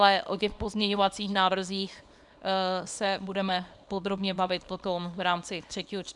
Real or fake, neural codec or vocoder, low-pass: fake; codec, 24 kHz, 0.9 kbps, WavTokenizer, small release; 10.8 kHz